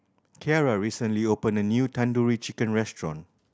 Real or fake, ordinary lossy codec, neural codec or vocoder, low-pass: real; none; none; none